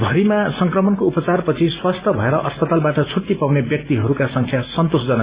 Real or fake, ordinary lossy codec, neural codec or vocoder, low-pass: real; Opus, 64 kbps; none; 3.6 kHz